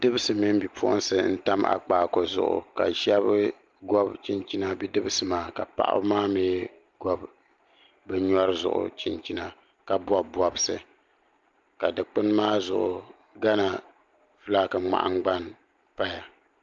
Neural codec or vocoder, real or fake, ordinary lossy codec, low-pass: none; real; Opus, 32 kbps; 7.2 kHz